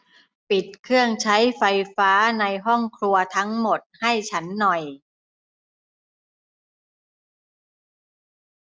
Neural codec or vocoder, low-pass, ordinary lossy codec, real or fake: none; none; none; real